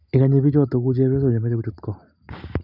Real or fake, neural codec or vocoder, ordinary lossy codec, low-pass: real; none; none; 5.4 kHz